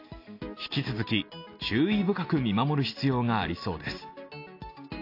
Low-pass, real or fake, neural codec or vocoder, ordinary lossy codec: 5.4 kHz; real; none; AAC, 32 kbps